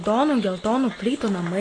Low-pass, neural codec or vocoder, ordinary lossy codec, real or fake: 9.9 kHz; none; AAC, 32 kbps; real